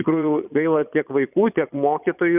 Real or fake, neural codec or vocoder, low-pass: fake; vocoder, 22.05 kHz, 80 mel bands, Vocos; 3.6 kHz